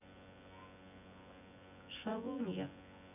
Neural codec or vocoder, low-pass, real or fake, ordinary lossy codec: vocoder, 24 kHz, 100 mel bands, Vocos; 3.6 kHz; fake; none